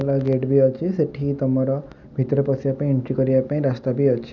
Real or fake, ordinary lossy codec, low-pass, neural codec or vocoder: real; none; 7.2 kHz; none